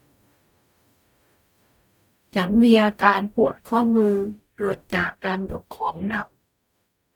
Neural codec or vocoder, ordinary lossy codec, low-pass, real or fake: codec, 44.1 kHz, 0.9 kbps, DAC; none; 19.8 kHz; fake